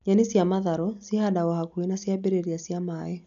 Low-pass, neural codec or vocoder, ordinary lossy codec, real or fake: 7.2 kHz; none; none; real